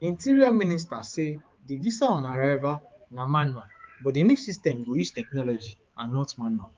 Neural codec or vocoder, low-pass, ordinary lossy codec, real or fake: codec, 16 kHz, 4 kbps, X-Codec, HuBERT features, trained on balanced general audio; 7.2 kHz; Opus, 24 kbps; fake